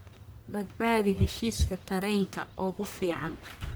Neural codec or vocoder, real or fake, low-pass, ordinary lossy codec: codec, 44.1 kHz, 1.7 kbps, Pupu-Codec; fake; none; none